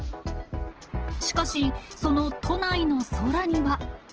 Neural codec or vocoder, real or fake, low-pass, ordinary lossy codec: none; real; 7.2 kHz; Opus, 16 kbps